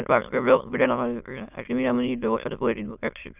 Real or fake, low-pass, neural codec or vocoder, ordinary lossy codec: fake; 3.6 kHz; autoencoder, 22.05 kHz, a latent of 192 numbers a frame, VITS, trained on many speakers; none